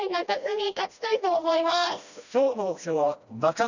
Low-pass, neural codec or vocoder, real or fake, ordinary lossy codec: 7.2 kHz; codec, 16 kHz, 1 kbps, FreqCodec, smaller model; fake; none